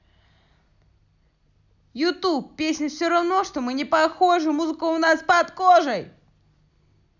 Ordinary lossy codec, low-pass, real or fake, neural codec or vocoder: none; 7.2 kHz; real; none